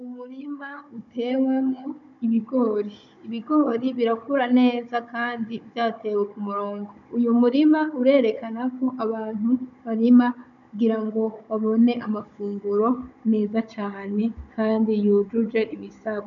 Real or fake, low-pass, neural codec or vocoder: fake; 7.2 kHz; codec, 16 kHz, 16 kbps, FunCodec, trained on Chinese and English, 50 frames a second